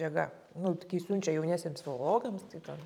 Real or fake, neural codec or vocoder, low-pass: fake; vocoder, 44.1 kHz, 128 mel bands every 512 samples, BigVGAN v2; 19.8 kHz